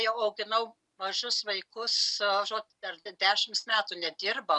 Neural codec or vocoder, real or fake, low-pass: none; real; 10.8 kHz